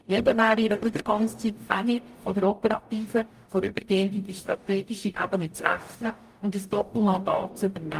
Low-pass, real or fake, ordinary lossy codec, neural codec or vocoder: 14.4 kHz; fake; Opus, 32 kbps; codec, 44.1 kHz, 0.9 kbps, DAC